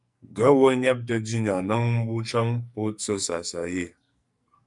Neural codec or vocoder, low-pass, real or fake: codec, 44.1 kHz, 2.6 kbps, SNAC; 10.8 kHz; fake